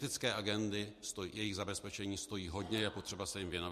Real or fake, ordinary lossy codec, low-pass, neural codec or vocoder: real; MP3, 64 kbps; 14.4 kHz; none